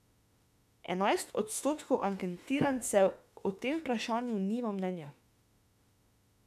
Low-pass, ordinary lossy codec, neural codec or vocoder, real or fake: 14.4 kHz; none; autoencoder, 48 kHz, 32 numbers a frame, DAC-VAE, trained on Japanese speech; fake